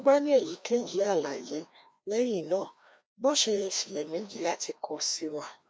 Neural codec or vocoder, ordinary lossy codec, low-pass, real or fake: codec, 16 kHz, 1 kbps, FreqCodec, larger model; none; none; fake